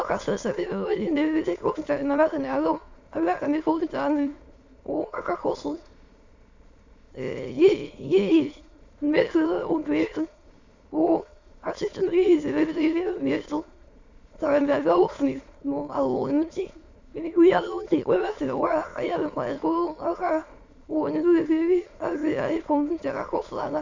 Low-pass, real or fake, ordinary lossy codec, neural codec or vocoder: 7.2 kHz; fake; Opus, 64 kbps; autoencoder, 22.05 kHz, a latent of 192 numbers a frame, VITS, trained on many speakers